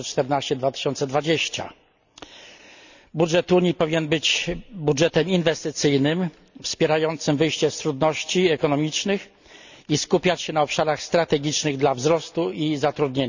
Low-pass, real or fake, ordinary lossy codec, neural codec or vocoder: 7.2 kHz; real; none; none